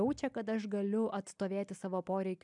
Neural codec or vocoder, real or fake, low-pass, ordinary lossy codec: none; real; 10.8 kHz; MP3, 96 kbps